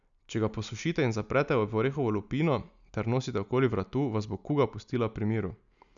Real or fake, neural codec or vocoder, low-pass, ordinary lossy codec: real; none; 7.2 kHz; none